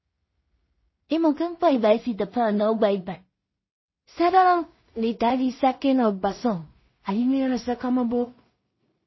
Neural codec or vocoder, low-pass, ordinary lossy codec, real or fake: codec, 16 kHz in and 24 kHz out, 0.4 kbps, LongCat-Audio-Codec, two codebook decoder; 7.2 kHz; MP3, 24 kbps; fake